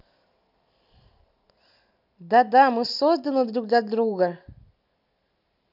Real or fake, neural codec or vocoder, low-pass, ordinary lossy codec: real; none; 5.4 kHz; none